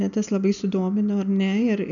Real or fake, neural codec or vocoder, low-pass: real; none; 7.2 kHz